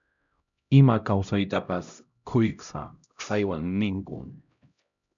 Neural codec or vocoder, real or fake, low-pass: codec, 16 kHz, 0.5 kbps, X-Codec, HuBERT features, trained on LibriSpeech; fake; 7.2 kHz